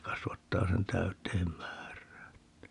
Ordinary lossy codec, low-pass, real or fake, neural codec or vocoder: none; 10.8 kHz; real; none